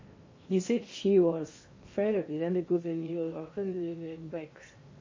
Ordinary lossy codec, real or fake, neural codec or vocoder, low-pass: MP3, 32 kbps; fake; codec, 16 kHz in and 24 kHz out, 0.8 kbps, FocalCodec, streaming, 65536 codes; 7.2 kHz